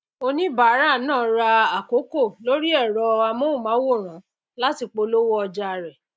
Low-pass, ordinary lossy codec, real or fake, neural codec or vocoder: none; none; real; none